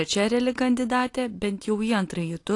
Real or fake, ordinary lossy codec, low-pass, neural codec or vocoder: real; AAC, 48 kbps; 10.8 kHz; none